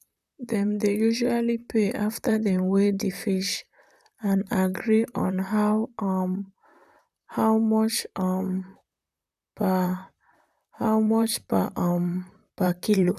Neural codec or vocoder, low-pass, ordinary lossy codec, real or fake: vocoder, 44.1 kHz, 128 mel bands, Pupu-Vocoder; 14.4 kHz; none; fake